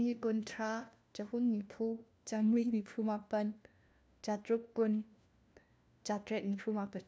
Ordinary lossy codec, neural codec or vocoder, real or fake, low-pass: none; codec, 16 kHz, 1 kbps, FunCodec, trained on LibriTTS, 50 frames a second; fake; none